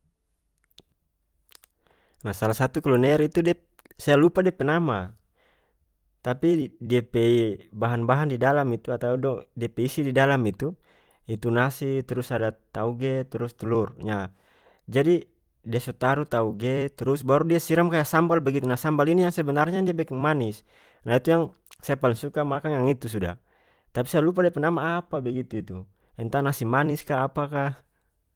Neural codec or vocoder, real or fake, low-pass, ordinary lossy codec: vocoder, 44.1 kHz, 128 mel bands every 256 samples, BigVGAN v2; fake; 19.8 kHz; Opus, 24 kbps